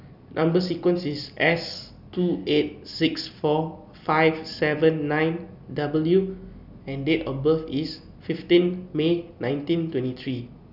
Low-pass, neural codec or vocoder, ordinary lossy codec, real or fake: 5.4 kHz; none; none; real